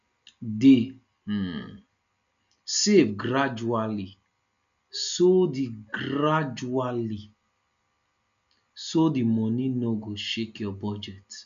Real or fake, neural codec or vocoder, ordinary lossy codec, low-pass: real; none; none; 7.2 kHz